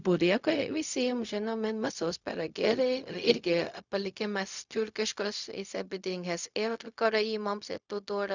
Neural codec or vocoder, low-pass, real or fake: codec, 16 kHz, 0.4 kbps, LongCat-Audio-Codec; 7.2 kHz; fake